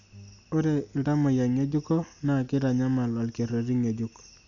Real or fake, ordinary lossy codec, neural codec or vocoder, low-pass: real; none; none; 7.2 kHz